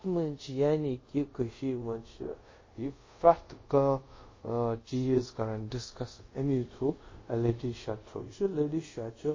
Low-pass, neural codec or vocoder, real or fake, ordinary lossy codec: 7.2 kHz; codec, 24 kHz, 0.5 kbps, DualCodec; fake; MP3, 32 kbps